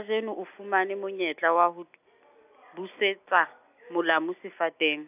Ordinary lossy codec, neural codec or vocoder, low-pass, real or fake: AAC, 32 kbps; none; 3.6 kHz; real